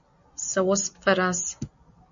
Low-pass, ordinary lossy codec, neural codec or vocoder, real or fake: 7.2 kHz; MP3, 64 kbps; none; real